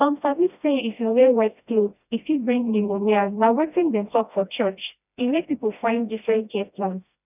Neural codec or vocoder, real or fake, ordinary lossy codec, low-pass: codec, 16 kHz, 1 kbps, FreqCodec, smaller model; fake; none; 3.6 kHz